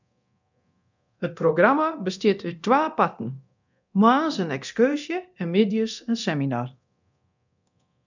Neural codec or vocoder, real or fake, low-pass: codec, 24 kHz, 0.9 kbps, DualCodec; fake; 7.2 kHz